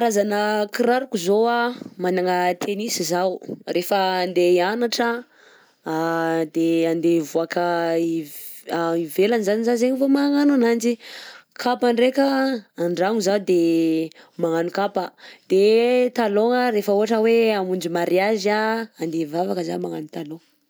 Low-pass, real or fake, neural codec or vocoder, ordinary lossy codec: none; real; none; none